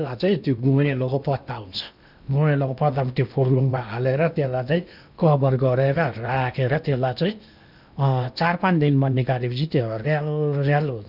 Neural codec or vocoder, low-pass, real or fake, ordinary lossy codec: codec, 16 kHz in and 24 kHz out, 0.8 kbps, FocalCodec, streaming, 65536 codes; 5.4 kHz; fake; none